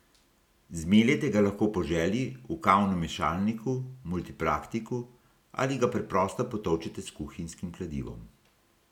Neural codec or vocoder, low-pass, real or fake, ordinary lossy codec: none; 19.8 kHz; real; none